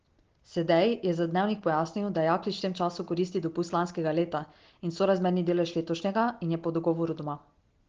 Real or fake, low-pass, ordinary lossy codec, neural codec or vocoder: real; 7.2 kHz; Opus, 16 kbps; none